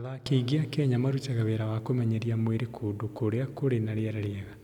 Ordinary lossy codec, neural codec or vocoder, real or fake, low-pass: none; none; real; 19.8 kHz